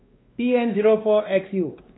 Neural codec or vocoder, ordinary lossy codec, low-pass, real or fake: codec, 16 kHz, 2 kbps, X-Codec, WavLM features, trained on Multilingual LibriSpeech; AAC, 16 kbps; 7.2 kHz; fake